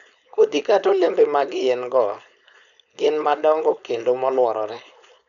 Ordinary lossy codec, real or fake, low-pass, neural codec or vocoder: none; fake; 7.2 kHz; codec, 16 kHz, 4.8 kbps, FACodec